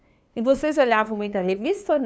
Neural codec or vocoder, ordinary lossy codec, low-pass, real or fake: codec, 16 kHz, 2 kbps, FunCodec, trained on LibriTTS, 25 frames a second; none; none; fake